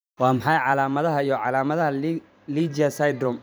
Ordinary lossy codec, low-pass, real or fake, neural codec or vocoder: none; none; fake; vocoder, 44.1 kHz, 128 mel bands every 512 samples, BigVGAN v2